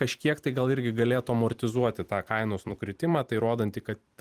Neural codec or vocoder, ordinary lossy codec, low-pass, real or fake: vocoder, 44.1 kHz, 128 mel bands every 256 samples, BigVGAN v2; Opus, 24 kbps; 14.4 kHz; fake